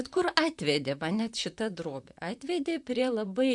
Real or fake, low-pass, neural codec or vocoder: fake; 10.8 kHz; vocoder, 44.1 kHz, 128 mel bands, Pupu-Vocoder